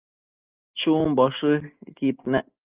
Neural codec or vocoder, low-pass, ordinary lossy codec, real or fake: none; 3.6 kHz; Opus, 24 kbps; real